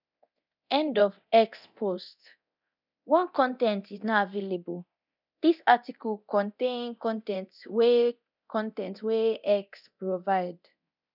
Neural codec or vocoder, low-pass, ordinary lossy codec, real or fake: codec, 24 kHz, 0.9 kbps, DualCodec; 5.4 kHz; none; fake